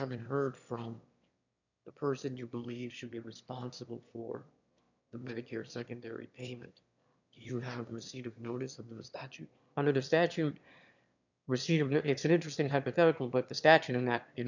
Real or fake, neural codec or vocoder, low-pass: fake; autoencoder, 22.05 kHz, a latent of 192 numbers a frame, VITS, trained on one speaker; 7.2 kHz